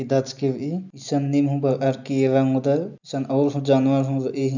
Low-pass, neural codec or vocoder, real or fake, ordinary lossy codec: 7.2 kHz; none; real; none